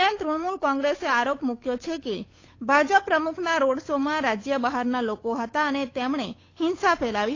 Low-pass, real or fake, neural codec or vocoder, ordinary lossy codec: 7.2 kHz; fake; codec, 16 kHz, 8 kbps, FunCodec, trained on Chinese and English, 25 frames a second; AAC, 32 kbps